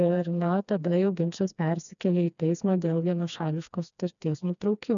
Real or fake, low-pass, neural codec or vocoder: fake; 7.2 kHz; codec, 16 kHz, 2 kbps, FreqCodec, smaller model